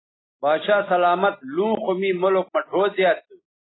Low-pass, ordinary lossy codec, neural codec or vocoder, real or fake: 7.2 kHz; AAC, 16 kbps; none; real